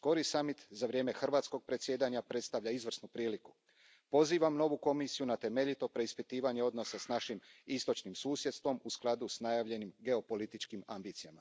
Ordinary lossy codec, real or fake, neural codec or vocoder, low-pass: none; real; none; none